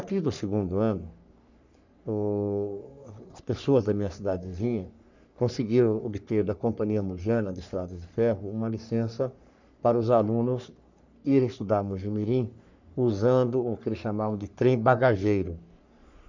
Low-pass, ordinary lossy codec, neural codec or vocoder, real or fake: 7.2 kHz; none; codec, 44.1 kHz, 3.4 kbps, Pupu-Codec; fake